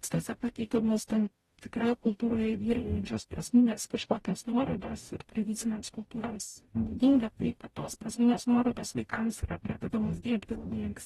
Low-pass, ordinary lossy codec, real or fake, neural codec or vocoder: 19.8 kHz; AAC, 32 kbps; fake; codec, 44.1 kHz, 0.9 kbps, DAC